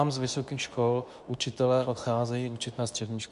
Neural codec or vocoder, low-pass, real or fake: codec, 24 kHz, 0.9 kbps, WavTokenizer, medium speech release version 2; 10.8 kHz; fake